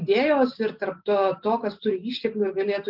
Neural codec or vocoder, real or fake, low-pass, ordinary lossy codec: none; real; 5.4 kHz; Opus, 24 kbps